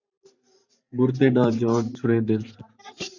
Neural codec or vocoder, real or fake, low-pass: vocoder, 44.1 kHz, 128 mel bands every 512 samples, BigVGAN v2; fake; 7.2 kHz